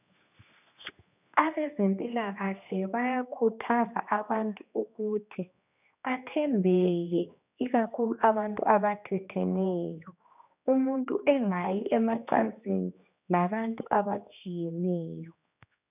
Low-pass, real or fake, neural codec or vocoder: 3.6 kHz; fake; codec, 16 kHz, 2 kbps, X-Codec, HuBERT features, trained on general audio